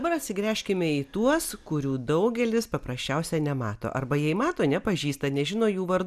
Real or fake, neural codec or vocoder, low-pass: real; none; 14.4 kHz